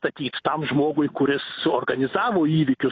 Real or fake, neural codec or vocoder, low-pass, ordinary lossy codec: real; none; 7.2 kHz; AAC, 32 kbps